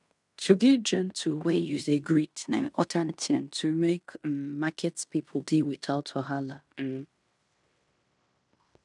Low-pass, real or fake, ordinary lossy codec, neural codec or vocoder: 10.8 kHz; fake; none; codec, 16 kHz in and 24 kHz out, 0.9 kbps, LongCat-Audio-Codec, fine tuned four codebook decoder